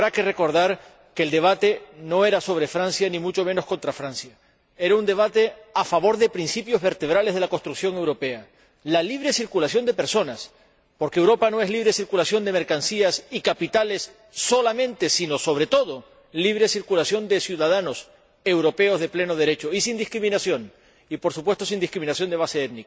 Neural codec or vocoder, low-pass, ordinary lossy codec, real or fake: none; none; none; real